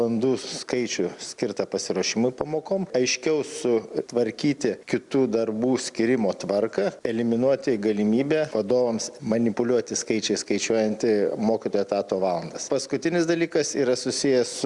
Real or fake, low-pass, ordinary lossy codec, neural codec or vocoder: real; 10.8 kHz; Opus, 64 kbps; none